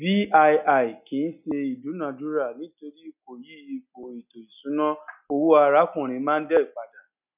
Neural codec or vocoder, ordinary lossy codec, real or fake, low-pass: none; none; real; 3.6 kHz